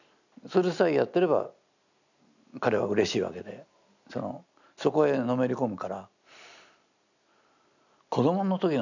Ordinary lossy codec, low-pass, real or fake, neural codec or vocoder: none; 7.2 kHz; real; none